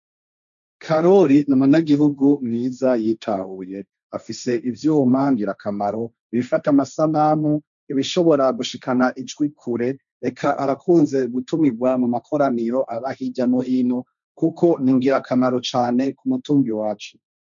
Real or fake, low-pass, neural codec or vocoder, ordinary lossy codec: fake; 7.2 kHz; codec, 16 kHz, 1.1 kbps, Voila-Tokenizer; MP3, 64 kbps